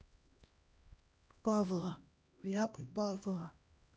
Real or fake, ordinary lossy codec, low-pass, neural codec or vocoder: fake; none; none; codec, 16 kHz, 1 kbps, X-Codec, HuBERT features, trained on LibriSpeech